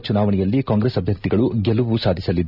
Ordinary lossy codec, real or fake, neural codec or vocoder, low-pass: none; real; none; 5.4 kHz